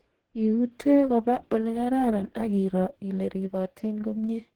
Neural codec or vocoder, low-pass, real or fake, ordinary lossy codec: codec, 44.1 kHz, 2.6 kbps, DAC; 19.8 kHz; fake; Opus, 16 kbps